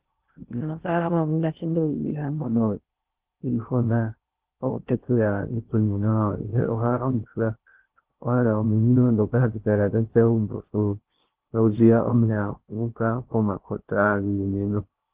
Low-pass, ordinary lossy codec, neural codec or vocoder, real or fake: 3.6 kHz; Opus, 16 kbps; codec, 16 kHz in and 24 kHz out, 0.6 kbps, FocalCodec, streaming, 2048 codes; fake